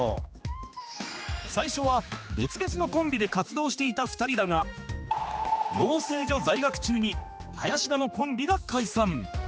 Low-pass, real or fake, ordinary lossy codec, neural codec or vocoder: none; fake; none; codec, 16 kHz, 2 kbps, X-Codec, HuBERT features, trained on general audio